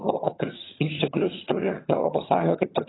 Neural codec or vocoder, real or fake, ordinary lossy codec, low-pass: vocoder, 22.05 kHz, 80 mel bands, HiFi-GAN; fake; AAC, 16 kbps; 7.2 kHz